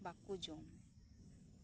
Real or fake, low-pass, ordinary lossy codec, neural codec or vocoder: real; none; none; none